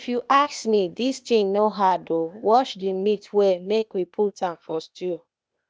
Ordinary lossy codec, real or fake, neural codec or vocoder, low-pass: none; fake; codec, 16 kHz, 0.8 kbps, ZipCodec; none